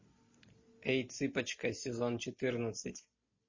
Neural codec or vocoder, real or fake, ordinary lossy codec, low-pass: none; real; MP3, 32 kbps; 7.2 kHz